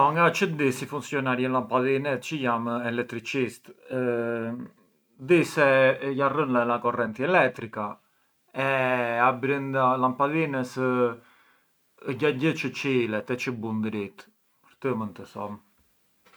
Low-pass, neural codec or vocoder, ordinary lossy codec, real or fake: none; none; none; real